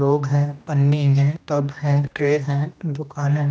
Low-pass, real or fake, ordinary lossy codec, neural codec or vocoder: none; fake; none; codec, 16 kHz, 1 kbps, X-Codec, HuBERT features, trained on general audio